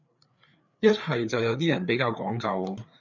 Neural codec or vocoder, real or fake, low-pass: codec, 16 kHz, 4 kbps, FreqCodec, larger model; fake; 7.2 kHz